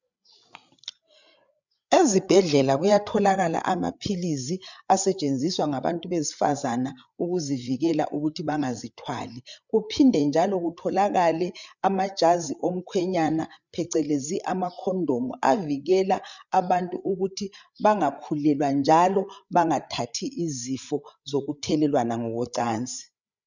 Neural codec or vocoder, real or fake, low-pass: codec, 16 kHz, 8 kbps, FreqCodec, larger model; fake; 7.2 kHz